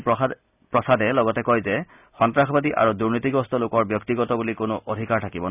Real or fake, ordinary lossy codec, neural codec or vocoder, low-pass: real; none; none; 3.6 kHz